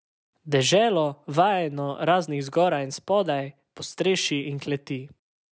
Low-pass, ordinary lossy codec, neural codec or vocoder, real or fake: none; none; none; real